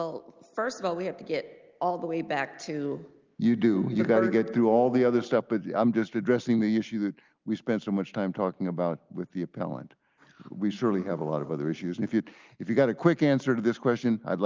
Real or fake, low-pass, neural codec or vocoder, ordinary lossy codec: real; 7.2 kHz; none; Opus, 32 kbps